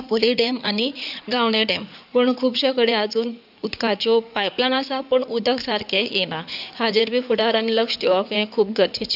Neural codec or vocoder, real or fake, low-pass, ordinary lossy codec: codec, 16 kHz in and 24 kHz out, 2.2 kbps, FireRedTTS-2 codec; fake; 5.4 kHz; none